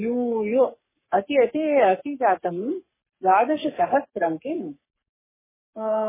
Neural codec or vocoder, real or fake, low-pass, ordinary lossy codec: codec, 44.1 kHz, 3.4 kbps, Pupu-Codec; fake; 3.6 kHz; MP3, 16 kbps